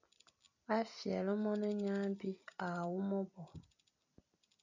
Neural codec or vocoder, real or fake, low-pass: vocoder, 44.1 kHz, 128 mel bands every 256 samples, BigVGAN v2; fake; 7.2 kHz